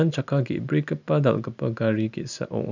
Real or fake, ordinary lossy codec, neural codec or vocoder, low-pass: real; none; none; 7.2 kHz